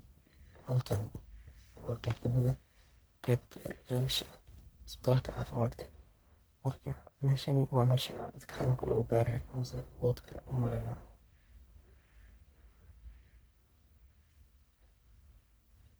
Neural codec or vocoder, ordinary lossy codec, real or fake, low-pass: codec, 44.1 kHz, 1.7 kbps, Pupu-Codec; none; fake; none